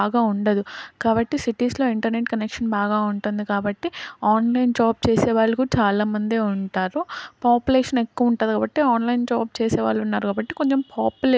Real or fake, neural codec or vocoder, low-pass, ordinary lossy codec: real; none; none; none